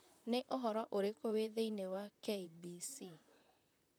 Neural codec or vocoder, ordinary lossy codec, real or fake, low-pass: vocoder, 44.1 kHz, 128 mel bands, Pupu-Vocoder; none; fake; none